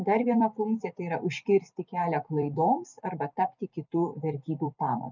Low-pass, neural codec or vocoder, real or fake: 7.2 kHz; vocoder, 44.1 kHz, 128 mel bands every 256 samples, BigVGAN v2; fake